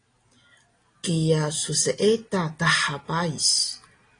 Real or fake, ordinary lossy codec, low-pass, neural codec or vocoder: real; AAC, 32 kbps; 9.9 kHz; none